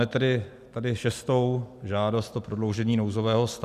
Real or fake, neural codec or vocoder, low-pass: real; none; 14.4 kHz